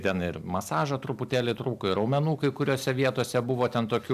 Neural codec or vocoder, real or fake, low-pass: none; real; 14.4 kHz